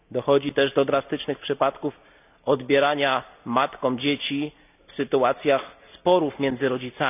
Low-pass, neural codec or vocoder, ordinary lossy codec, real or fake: 3.6 kHz; none; none; real